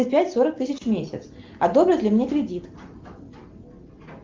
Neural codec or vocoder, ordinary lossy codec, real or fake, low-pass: none; Opus, 32 kbps; real; 7.2 kHz